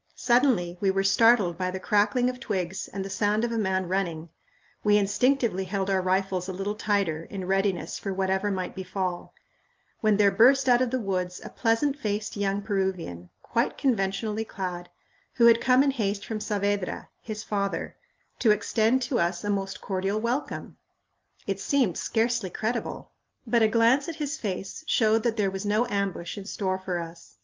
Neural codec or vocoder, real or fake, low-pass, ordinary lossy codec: none; real; 7.2 kHz; Opus, 24 kbps